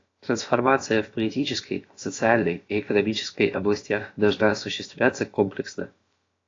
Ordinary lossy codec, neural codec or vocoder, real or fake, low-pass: AAC, 32 kbps; codec, 16 kHz, about 1 kbps, DyCAST, with the encoder's durations; fake; 7.2 kHz